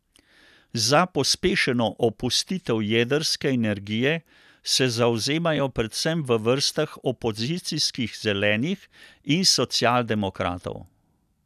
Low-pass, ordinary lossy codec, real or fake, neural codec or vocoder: 14.4 kHz; none; real; none